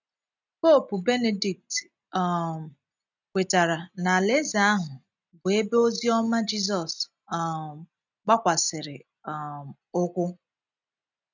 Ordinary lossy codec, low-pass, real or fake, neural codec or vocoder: none; 7.2 kHz; real; none